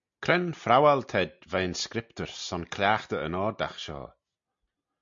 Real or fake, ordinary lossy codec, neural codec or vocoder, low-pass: real; MP3, 48 kbps; none; 7.2 kHz